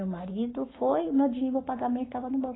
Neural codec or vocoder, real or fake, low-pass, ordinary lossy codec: codec, 44.1 kHz, 7.8 kbps, Pupu-Codec; fake; 7.2 kHz; AAC, 16 kbps